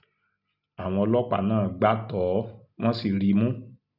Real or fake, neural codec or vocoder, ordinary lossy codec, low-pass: real; none; none; 5.4 kHz